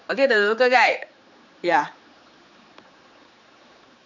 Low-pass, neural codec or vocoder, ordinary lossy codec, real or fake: 7.2 kHz; codec, 16 kHz, 4 kbps, X-Codec, HuBERT features, trained on general audio; none; fake